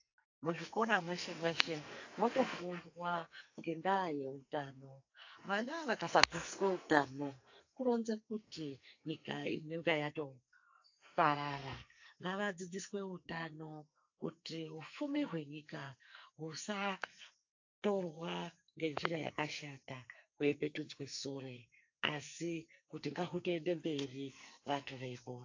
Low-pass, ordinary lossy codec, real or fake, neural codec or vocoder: 7.2 kHz; AAC, 48 kbps; fake; codec, 44.1 kHz, 2.6 kbps, SNAC